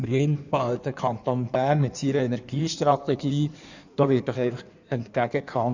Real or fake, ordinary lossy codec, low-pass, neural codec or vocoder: fake; none; 7.2 kHz; codec, 16 kHz in and 24 kHz out, 1.1 kbps, FireRedTTS-2 codec